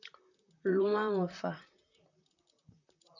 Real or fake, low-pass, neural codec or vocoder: fake; 7.2 kHz; vocoder, 44.1 kHz, 128 mel bands, Pupu-Vocoder